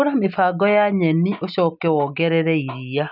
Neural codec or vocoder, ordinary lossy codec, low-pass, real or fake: none; none; 5.4 kHz; real